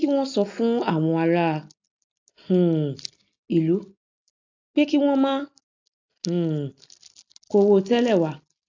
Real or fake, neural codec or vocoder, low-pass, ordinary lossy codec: real; none; 7.2 kHz; AAC, 48 kbps